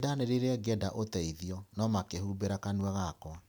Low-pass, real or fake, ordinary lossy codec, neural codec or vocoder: none; real; none; none